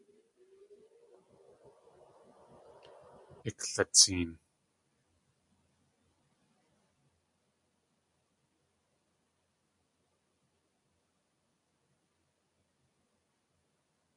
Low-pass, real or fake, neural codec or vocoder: 10.8 kHz; real; none